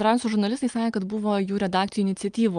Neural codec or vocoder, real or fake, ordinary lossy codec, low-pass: none; real; Opus, 32 kbps; 9.9 kHz